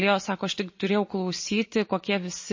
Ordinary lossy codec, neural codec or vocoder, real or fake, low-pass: MP3, 32 kbps; none; real; 7.2 kHz